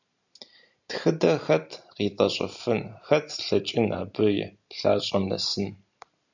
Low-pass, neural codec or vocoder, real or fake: 7.2 kHz; none; real